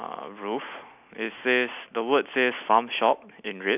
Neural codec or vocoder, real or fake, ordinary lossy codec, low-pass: none; real; none; 3.6 kHz